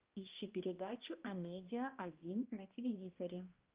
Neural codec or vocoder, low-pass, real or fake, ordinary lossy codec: codec, 16 kHz, 1 kbps, X-Codec, HuBERT features, trained on balanced general audio; 3.6 kHz; fake; Opus, 32 kbps